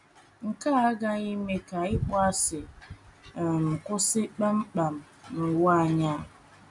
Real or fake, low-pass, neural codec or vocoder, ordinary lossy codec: real; 10.8 kHz; none; none